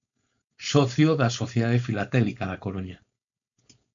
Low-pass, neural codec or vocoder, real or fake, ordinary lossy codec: 7.2 kHz; codec, 16 kHz, 4.8 kbps, FACodec; fake; MP3, 64 kbps